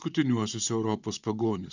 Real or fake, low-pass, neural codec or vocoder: fake; 7.2 kHz; vocoder, 44.1 kHz, 128 mel bands, Pupu-Vocoder